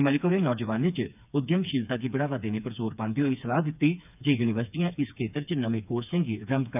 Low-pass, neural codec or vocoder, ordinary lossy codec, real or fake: 3.6 kHz; codec, 16 kHz, 4 kbps, FreqCodec, smaller model; none; fake